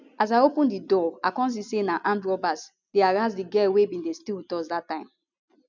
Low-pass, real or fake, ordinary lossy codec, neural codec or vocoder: 7.2 kHz; real; none; none